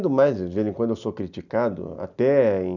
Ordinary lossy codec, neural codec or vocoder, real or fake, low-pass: none; none; real; 7.2 kHz